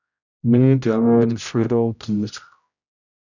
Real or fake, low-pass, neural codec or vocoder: fake; 7.2 kHz; codec, 16 kHz, 0.5 kbps, X-Codec, HuBERT features, trained on general audio